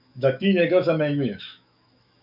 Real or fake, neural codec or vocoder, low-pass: fake; autoencoder, 48 kHz, 128 numbers a frame, DAC-VAE, trained on Japanese speech; 5.4 kHz